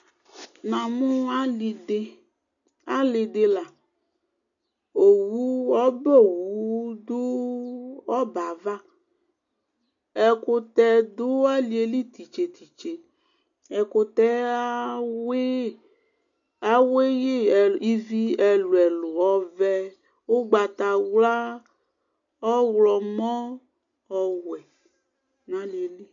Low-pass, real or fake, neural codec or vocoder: 7.2 kHz; real; none